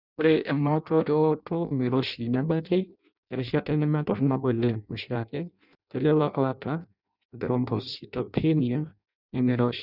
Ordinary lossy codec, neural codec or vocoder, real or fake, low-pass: none; codec, 16 kHz in and 24 kHz out, 0.6 kbps, FireRedTTS-2 codec; fake; 5.4 kHz